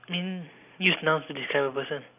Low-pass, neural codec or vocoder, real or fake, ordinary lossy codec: 3.6 kHz; none; real; none